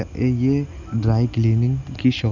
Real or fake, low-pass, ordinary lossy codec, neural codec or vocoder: real; 7.2 kHz; none; none